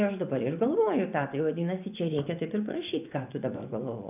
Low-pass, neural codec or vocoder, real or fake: 3.6 kHz; vocoder, 24 kHz, 100 mel bands, Vocos; fake